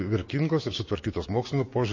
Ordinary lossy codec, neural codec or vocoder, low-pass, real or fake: MP3, 32 kbps; codec, 16 kHz, 6 kbps, DAC; 7.2 kHz; fake